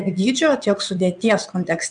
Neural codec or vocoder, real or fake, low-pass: vocoder, 22.05 kHz, 80 mel bands, WaveNeXt; fake; 9.9 kHz